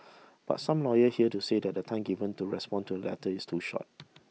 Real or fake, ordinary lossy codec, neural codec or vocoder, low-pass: real; none; none; none